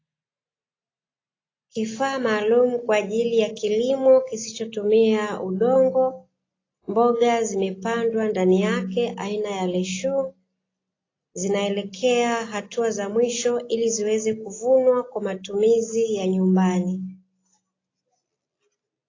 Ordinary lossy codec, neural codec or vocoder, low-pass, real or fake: AAC, 32 kbps; none; 7.2 kHz; real